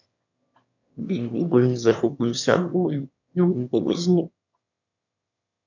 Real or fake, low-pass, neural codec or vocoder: fake; 7.2 kHz; autoencoder, 22.05 kHz, a latent of 192 numbers a frame, VITS, trained on one speaker